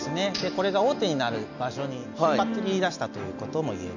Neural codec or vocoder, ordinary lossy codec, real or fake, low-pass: none; none; real; 7.2 kHz